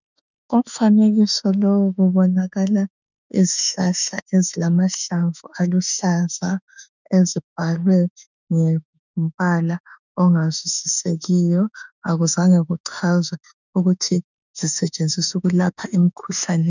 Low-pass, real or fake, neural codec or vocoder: 7.2 kHz; fake; autoencoder, 48 kHz, 32 numbers a frame, DAC-VAE, trained on Japanese speech